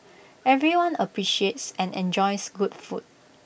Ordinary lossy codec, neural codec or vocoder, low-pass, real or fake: none; none; none; real